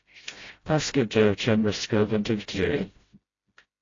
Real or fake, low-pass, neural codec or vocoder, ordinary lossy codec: fake; 7.2 kHz; codec, 16 kHz, 0.5 kbps, FreqCodec, smaller model; AAC, 32 kbps